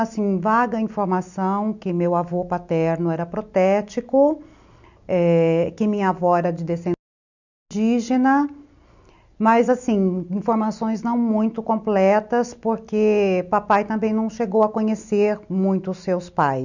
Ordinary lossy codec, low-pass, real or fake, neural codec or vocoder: none; 7.2 kHz; real; none